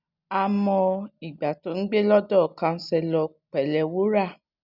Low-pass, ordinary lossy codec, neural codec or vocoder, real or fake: 5.4 kHz; none; none; real